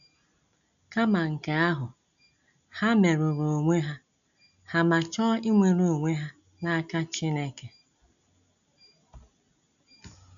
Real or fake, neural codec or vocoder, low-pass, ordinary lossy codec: real; none; 7.2 kHz; none